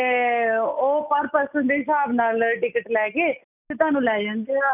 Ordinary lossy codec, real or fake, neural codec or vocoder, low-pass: none; real; none; 3.6 kHz